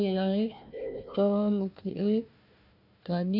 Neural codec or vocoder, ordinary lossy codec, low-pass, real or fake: codec, 16 kHz, 1 kbps, FunCodec, trained on Chinese and English, 50 frames a second; none; 5.4 kHz; fake